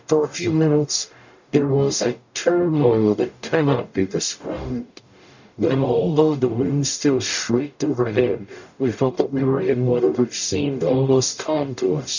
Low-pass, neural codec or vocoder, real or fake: 7.2 kHz; codec, 44.1 kHz, 0.9 kbps, DAC; fake